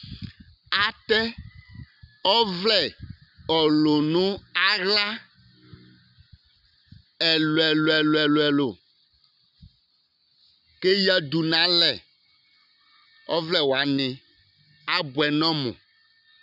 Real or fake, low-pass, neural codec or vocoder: real; 5.4 kHz; none